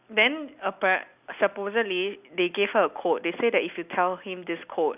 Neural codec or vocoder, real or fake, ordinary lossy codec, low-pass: none; real; none; 3.6 kHz